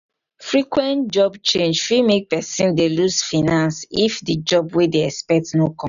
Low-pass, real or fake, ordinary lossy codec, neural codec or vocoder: 7.2 kHz; real; none; none